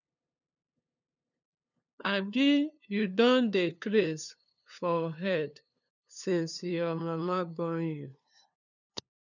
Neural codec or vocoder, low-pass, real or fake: codec, 16 kHz, 2 kbps, FunCodec, trained on LibriTTS, 25 frames a second; 7.2 kHz; fake